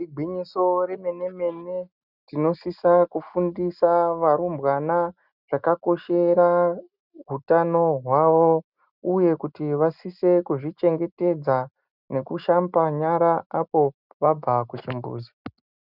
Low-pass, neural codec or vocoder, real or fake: 5.4 kHz; none; real